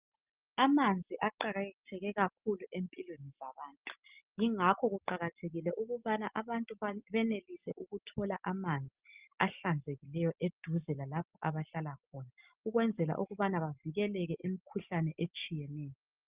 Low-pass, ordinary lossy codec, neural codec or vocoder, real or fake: 3.6 kHz; Opus, 24 kbps; none; real